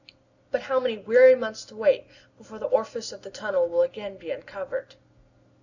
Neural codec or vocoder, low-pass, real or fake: none; 7.2 kHz; real